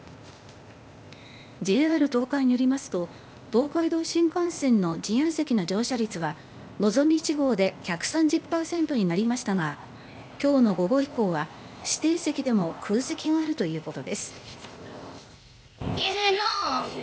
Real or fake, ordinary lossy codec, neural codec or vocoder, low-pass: fake; none; codec, 16 kHz, 0.8 kbps, ZipCodec; none